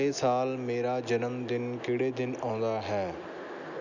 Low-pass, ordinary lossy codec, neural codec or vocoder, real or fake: 7.2 kHz; none; none; real